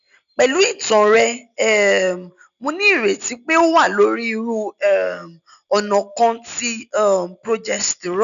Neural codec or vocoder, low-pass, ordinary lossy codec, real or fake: none; 7.2 kHz; none; real